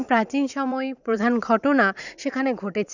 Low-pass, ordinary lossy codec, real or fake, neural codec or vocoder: 7.2 kHz; none; fake; vocoder, 44.1 kHz, 80 mel bands, Vocos